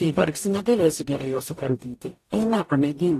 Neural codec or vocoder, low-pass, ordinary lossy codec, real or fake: codec, 44.1 kHz, 0.9 kbps, DAC; 14.4 kHz; AAC, 64 kbps; fake